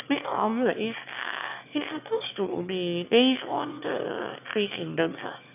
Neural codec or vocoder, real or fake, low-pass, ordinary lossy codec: autoencoder, 22.05 kHz, a latent of 192 numbers a frame, VITS, trained on one speaker; fake; 3.6 kHz; none